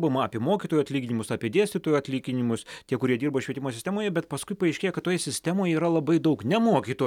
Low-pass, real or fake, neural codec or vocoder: 19.8 kHz; real; none